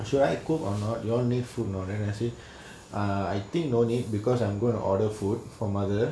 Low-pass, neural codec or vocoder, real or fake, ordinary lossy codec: none; none; real; none